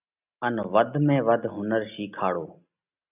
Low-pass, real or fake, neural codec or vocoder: 3.6 kHz; real; none